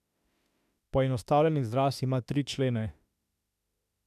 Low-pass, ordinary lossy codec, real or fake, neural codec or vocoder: 14.4 kHz; none; fake; autoencoder, 48 kHz, 32 numbers a frame, DAC-VAE, trained on Japanese speech